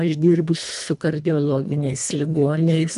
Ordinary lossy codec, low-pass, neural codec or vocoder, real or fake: MP3, 96 kbps; 10.8 kHz; codec, 24 kHz, 1.5 kbps, HILCodec; fake